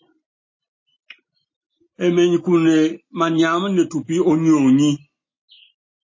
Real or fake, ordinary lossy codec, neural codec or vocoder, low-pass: real; MP3, 32 kbps; none; 7.2 kHz